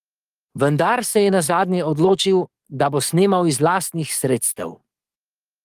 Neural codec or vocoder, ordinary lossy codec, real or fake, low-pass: codec, 44.1 kHz, 7.8 kbps, DAC; Opus, 24 kbps; fake; 14.4 kHz